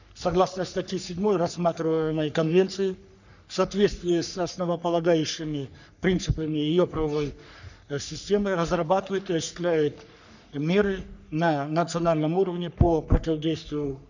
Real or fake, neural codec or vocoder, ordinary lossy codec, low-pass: fake; codec, 44.1 kHz, 3.4 kbps, Pupu-Codec; none; 7.2 kHz